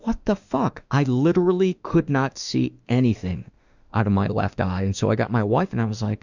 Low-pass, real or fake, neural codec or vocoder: 7.2 kHz; fake; autoencoder, 48 kHz, 32 numbers a frame, DAC-VAE, trained on Japanese speech